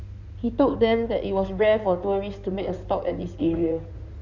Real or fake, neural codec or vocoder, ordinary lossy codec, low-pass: fake; codec, 16 kHz in and 24 kHz out, 2.2 kbps, FireRedTTS-2 codec; none; 7.2 kHz